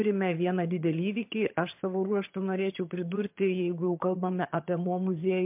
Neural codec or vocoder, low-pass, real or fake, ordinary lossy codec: vocoder, 22.05 kHz, 80 mel bands, HiFi-GAN; 3.6 kHz; fake; MP3, 32 kbps